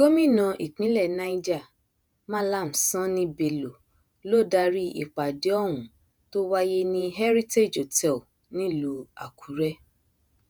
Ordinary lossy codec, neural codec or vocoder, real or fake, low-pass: none; vocoder, 48 kHz, 128 mel bands, Vocos; fake; none